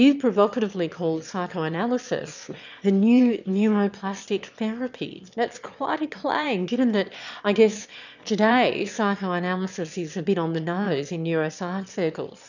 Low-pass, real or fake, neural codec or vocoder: 7.2 kHz; fake; autoencoder, 22.05 kHz, a latent of 192 numbers a frame, VITS, trained on one speaker